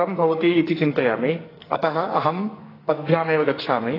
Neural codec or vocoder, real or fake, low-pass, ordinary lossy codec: codec, 44.1 kHz, 2.6 kbps, SNAC; fake; 5.4 kHz; AAC, 24 kbps